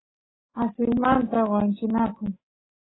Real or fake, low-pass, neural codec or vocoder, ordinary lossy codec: real; 7.2 kHz; none; AAC, 16 kbps